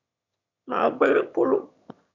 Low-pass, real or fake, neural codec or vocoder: 7.2 kHz; fake; autoencoder, 22.05 kHz, a latent of 192 numbers a frame, VITS, trained on one speaker